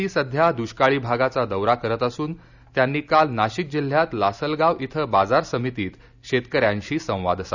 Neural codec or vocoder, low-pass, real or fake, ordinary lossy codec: none; 7.2 kHz; real; none